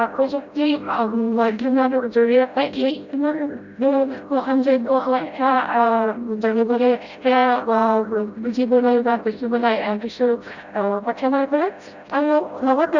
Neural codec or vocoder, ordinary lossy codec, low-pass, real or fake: codec, 16 kHz, 0.5 kbps, FreqCodec, smaller model; Opus, 64 kbps; 7.2 kHz; fake